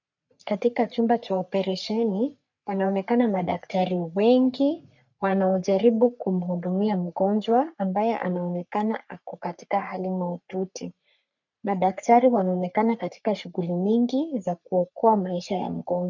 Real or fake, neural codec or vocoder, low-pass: fake; codec, 44.1 kHz, 3.4 kbps, Pupu-Codec; 7.2 kHz